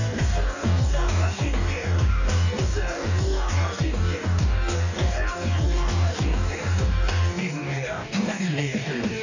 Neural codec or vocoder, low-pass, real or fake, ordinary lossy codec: codec, 44.1 kHz, 2.6 kbps, DAC; 7.2 kHz; fake; none